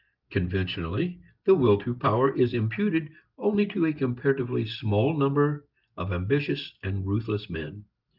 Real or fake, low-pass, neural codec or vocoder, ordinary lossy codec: real; 5.4 kHz; none; Opus, 24 kbps